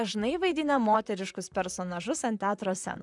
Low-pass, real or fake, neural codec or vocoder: 10.8 kHz; fake; vocoder, 44.1 kHz, 128 mel bands, Pupu-Vocoder